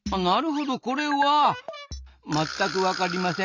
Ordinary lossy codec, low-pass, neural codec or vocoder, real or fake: none; 7.2 kHz; none; real